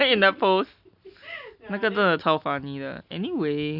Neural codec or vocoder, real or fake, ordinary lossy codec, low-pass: none; real; none; 5.4 kHz